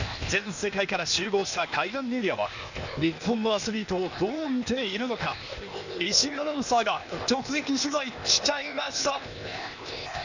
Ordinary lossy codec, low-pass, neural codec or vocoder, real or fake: none; 7.2 kHz; codec, 16 kHz, 0.8 kbps, ZipCodec; fake